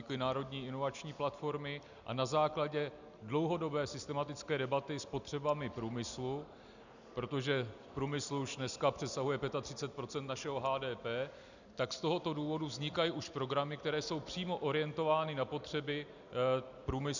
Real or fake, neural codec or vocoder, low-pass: real; none; 7.2 kHz